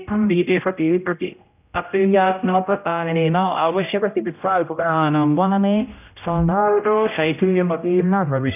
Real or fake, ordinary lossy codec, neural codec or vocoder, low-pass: fake; none; codec, 16 kHz, 0.5 kbps, X-Codec, HuBERT features, trained on general audio; 3.6 kHz